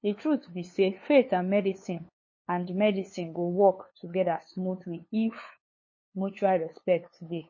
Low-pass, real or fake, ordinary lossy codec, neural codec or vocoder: 7.2 kHz; fake; MP3, 32 kbps; codec, 16 kHz, 2 kbps, FunCodec, trained on LibriTTS, 25 frames a second